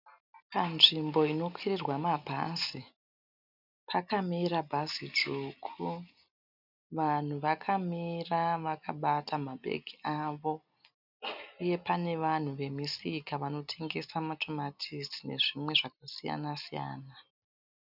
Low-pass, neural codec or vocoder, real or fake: 5.4 kHz; none; real